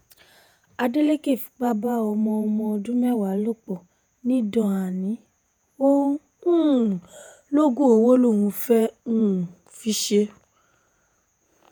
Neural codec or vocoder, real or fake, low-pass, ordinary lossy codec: vocoder, 48 kHz, 128 mel bands, Vocos; fake; none; none